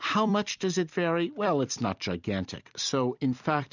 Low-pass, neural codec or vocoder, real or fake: 7.2 kHz; vocoder, 44.1 kHz, 128 mel bands every 256 samples, BigVGAN v2; fake